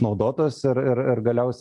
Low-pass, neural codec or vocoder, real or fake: 10.8 kHz; none; real